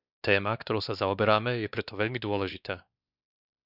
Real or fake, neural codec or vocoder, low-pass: fake; codec, 16 kHz, 2 kbps, X-Codec, WavLM features, trained on Multilingual LibriSpeech; 5.4 kHz